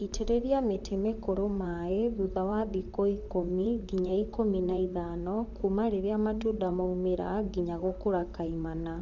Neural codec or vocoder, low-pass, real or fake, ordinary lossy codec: vocoder, 44.1 kHz, 128 mel bands, Pupu-Vocoder; 7.2 kHz; fake; none